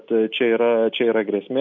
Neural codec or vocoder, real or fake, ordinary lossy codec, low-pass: none; real; MP3, 64 kbps; 7.2 kHz